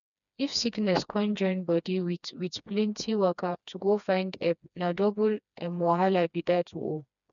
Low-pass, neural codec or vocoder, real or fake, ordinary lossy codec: 7.2 kHz; codec, 16 kHz, 2 kbps, FreqCodec, smaller model; fake; none